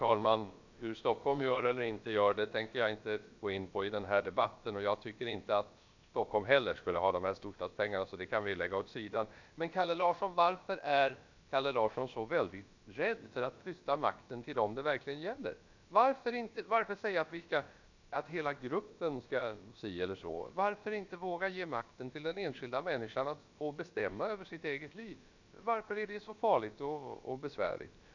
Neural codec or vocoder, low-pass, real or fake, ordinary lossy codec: codec, 16 kHz, about 1 kbps, DyCAST, with the encoder's durations; 7.2 kHz; fake; MP3, 64 kbps